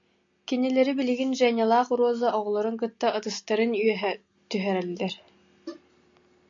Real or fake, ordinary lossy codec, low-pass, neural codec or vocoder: real; AAC, 64 kbps; 7.2 kHz; none